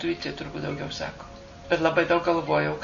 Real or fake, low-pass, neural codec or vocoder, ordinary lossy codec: real; 7.2 kHz; none; MP3, 48 kbps